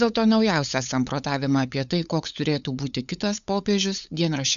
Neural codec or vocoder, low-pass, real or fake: codec, 16 kHz, 8 kbps, FunCodec, trained on LibriTTS, 25 frames a second; 7.2 kHz; fake